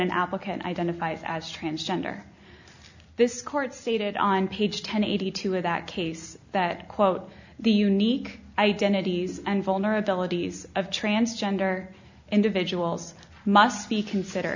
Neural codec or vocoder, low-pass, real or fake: none; 7.2 kHz; real